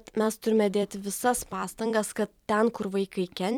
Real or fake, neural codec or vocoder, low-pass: fake; vocoder, 44.1 kHz, 128 mel bands every 256 samples, BigVGAN v2; 19.8 kHz